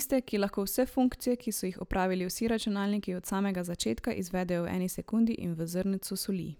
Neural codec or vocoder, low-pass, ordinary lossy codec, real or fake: none; none; none; real